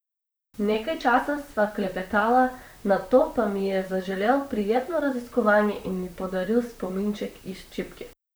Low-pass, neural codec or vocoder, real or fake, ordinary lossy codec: none; vocoder, 44.1 kHz, 128 mel bands, Pupu-Vocoder; fake; none